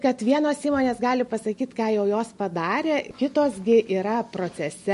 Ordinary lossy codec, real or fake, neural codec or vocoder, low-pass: MP3, 48 kbps; real; none; 14.4 kHz